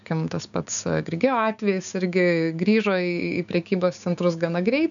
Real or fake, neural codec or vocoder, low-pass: fake; codec, 16 kHz, 6 kbps, DAC; 7.2 kHz